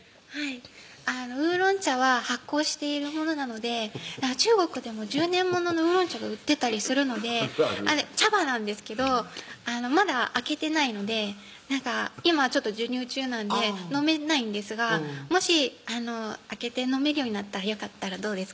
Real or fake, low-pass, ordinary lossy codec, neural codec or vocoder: real; none; none; none